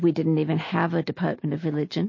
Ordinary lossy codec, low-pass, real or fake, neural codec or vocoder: MP3, 32 kbps; 7.2 kHz; real; none